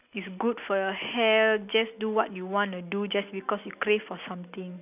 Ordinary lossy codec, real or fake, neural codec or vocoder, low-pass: none; real; none; 3.6 kHz